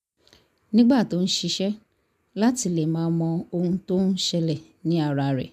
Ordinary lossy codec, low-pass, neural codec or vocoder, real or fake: none; 14.4 kHz; none; real